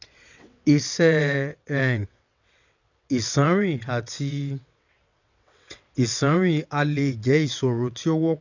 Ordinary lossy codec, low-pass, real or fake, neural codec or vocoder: none; 7.2 kHz; fake; vocoder, 22.05 kHz, 80 mel bands, WaveNeXt